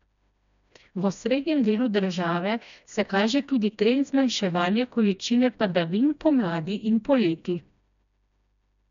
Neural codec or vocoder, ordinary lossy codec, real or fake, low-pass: codec, 16 kHz, 1 kbps, FreqCodec, smaller model; none; fake; 7.2 kHz